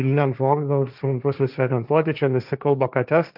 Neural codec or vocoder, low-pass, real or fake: codec, 16 kHz, 1.1 kbps, Voila-Tokenizer; 5.4 kHz; fake